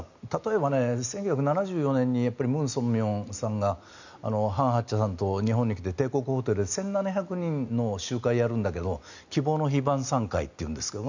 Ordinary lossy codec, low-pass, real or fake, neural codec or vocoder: none; 7.2 kHz; real; none